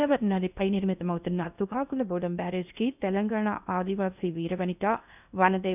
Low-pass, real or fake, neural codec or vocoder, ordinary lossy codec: 3.6 kHz; fake; codec, 16 kHz in and 24 kHz out, 0.8 kbps, FocalCodec, streaming, 65536 codes; none